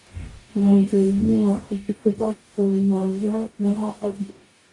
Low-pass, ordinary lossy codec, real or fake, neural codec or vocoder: 10.8 kHz; AAC, 48 kbps; fake; codec, 44.1 kHz, 0.9 kbps, DAC